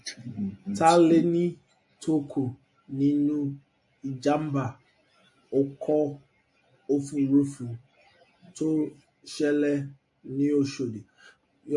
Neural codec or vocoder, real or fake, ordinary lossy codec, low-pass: none; real; MP3, 48 kbps; 10.8 kHz